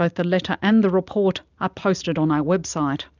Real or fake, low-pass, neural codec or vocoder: real; 7.2 kHz; none